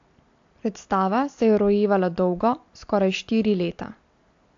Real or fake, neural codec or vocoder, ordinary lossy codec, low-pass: real; none; AAC, 48 kbps; 7.2 kHz